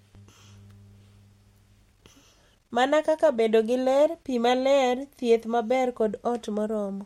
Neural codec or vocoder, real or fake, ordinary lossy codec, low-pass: none; real; MP3, 64 kbps; 19.8 kHz